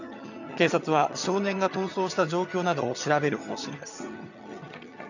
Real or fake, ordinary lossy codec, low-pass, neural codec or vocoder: fake; none; 7.2 kHz; vocoder, 22.05 kHz, 80 mel bands, HiFi-GAN